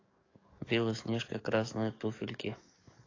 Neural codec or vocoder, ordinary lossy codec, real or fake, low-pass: codec, 44.1 kHz, 7.8 kbps, DAC; AAC, 32 kbps; fake; 7.2 kHz